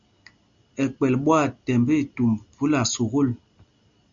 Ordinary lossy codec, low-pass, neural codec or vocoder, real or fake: Opus, 64 kbps; 7.2 kHz; none; real